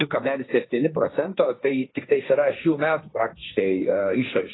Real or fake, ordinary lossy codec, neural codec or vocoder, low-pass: fake; AAC, 16 kbps; codec, 16 kHz, 1.1 kbps, Voila-Tokenizer; 7.2 kHz